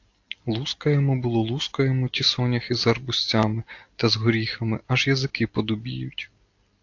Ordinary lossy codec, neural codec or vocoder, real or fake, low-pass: AAC, 48 kbps; none; real; 7.2 kHz